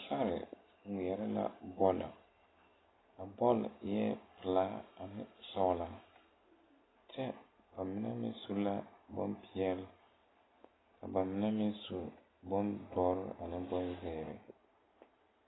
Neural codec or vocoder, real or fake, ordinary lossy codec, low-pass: none; real; AAC, 16 kbps; 7.2 kHz